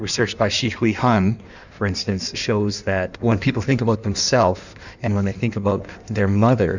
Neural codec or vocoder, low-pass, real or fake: codec, 16 kHz in and 24 kHz out, 1.1 kbps, FireRedTTS-2 codec; 7.2 kHz; fake